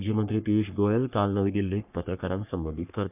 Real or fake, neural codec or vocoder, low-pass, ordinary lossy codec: fake; codec, 44.1 kHz, 3.4 kbps, Pupu-Codec; 3.6 kHz; none